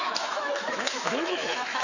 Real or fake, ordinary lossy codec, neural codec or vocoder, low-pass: fake; none; autoencoder, 48 kHz, 128 numbers a frame, DAC-VAE, trained on Japanese speech; 7.2 kHz